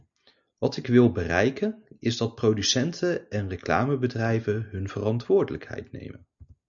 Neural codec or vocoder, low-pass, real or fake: none; 7.2 kHz; real